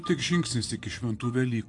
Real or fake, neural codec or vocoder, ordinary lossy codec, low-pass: fake; vocoder, 44.1 kHz, 128 mel bands every 512 samples, BigVGAN v2; AAC, 48 kbps; 10.8 kHz